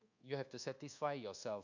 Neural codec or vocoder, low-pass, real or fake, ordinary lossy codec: codec, 24 kHz, 1.2 kbps, DualCodec; 7.2 kHz; fake; none